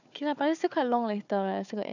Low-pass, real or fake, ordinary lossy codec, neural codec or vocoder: 7.2 kHz; fake; none; codec, 16 kHz, 4 kbps, FunCodec, trained on Chinese and English, 50 frames a second